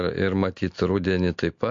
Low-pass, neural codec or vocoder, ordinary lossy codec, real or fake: 7.2 kHz; none; MP3, 48 kbps; real